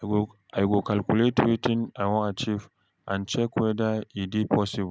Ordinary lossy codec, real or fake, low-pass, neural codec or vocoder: none; real; none; none